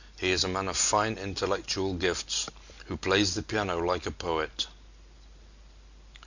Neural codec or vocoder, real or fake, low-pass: none; real; 7.2 kHz